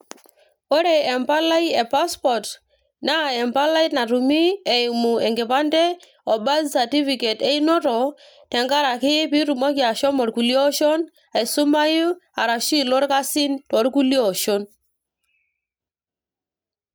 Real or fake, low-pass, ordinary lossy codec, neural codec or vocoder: real; none; none; none